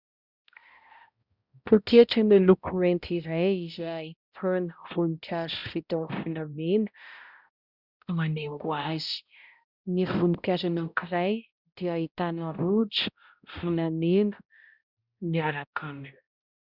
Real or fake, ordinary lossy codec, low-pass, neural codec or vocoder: fake; Opus, 64 kbps; 5.4 kHz; codec, 16 kHz, 0.5 kbps, X-Codec, HuBERT features, trained on balanced general audio